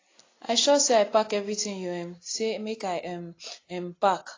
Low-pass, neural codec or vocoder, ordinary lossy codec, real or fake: 7.2 kHz; none; AAC, 32 kbps; real